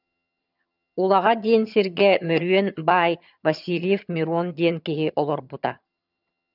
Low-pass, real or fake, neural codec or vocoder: 5.4 kHz; fake; vocoder, 22.05 kHz, 80 mel bands, HiFi-GAN